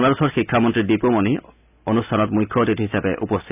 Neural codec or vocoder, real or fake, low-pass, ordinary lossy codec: none; real; 3.6 kHz; none